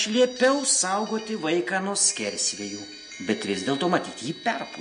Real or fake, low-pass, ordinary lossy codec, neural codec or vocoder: real; 9.9 kHz; MP3, 48 kbps; none